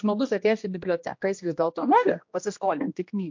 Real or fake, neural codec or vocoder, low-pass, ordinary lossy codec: fake; codec, 16 kHz, 1 kbps, X-Codec, HuBERT features, trained on general audio; 7.2 kHz; MP3, 48 kbps